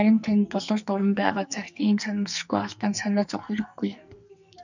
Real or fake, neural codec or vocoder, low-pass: fake; codec, 44.1 kHz, 2.6 kbps, SNAC; 7.2 kHz